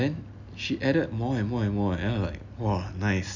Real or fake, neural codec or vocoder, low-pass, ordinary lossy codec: real; none; 7.2 kHz; none